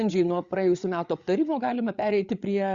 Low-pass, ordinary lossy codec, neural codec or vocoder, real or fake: 7.2 kHz; Opus, 64 kbps; codec, 16 kHz, 8 kbps, FreqCodec, larger model; fake